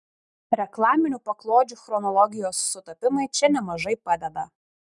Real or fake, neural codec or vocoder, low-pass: real; none; 10.8 kHz